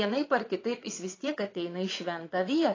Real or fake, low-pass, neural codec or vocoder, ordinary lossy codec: fake; 7.2 kHz; vocoder, 22.05 kHz, 80 mel bands, WaveNeXt; AAC, 32 kbps